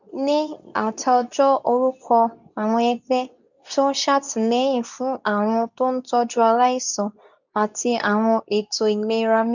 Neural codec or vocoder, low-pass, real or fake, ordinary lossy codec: codec, 24 kHz, 0.9 kbps, WavTokenizer, medium speech release version 2; 7.2 kHz; fake; none